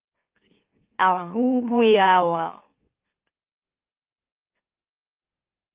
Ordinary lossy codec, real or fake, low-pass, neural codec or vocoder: Opus, 32 kbps; fake; 3.6 kHz; autoencoder, 44.1 kHz, a latent of 192 numbers a frame, MeloTTS